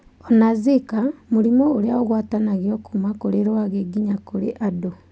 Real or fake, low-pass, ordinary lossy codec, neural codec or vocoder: real; none; none; none